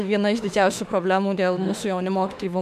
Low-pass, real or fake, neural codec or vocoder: 14.4 kHz; fake; autoencoder, 48 kHz, 32 numbers a frame, DAC-VAE, trained on Japanese speech